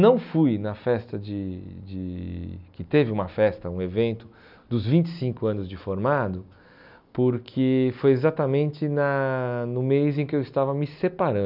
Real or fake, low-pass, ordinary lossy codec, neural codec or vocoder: real; 5.4 kHz; none; none